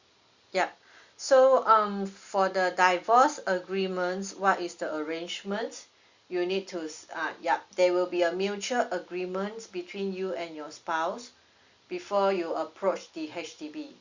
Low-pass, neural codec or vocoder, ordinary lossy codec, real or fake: 7.2 kHz; none; Opus, 64 kbps; real